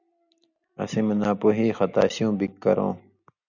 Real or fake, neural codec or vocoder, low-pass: real; none; 7.2 kHz